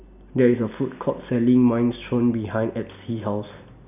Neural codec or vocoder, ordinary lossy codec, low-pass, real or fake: none; none; 3.6 kHz; real